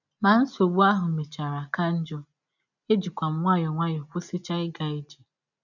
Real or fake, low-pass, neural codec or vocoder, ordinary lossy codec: real; 7.2 kHz; none; none